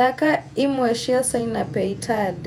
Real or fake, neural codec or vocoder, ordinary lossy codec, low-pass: fake; vocoder, 48 kHz, 128 mel bands, Vocos; none; 19.8 kHz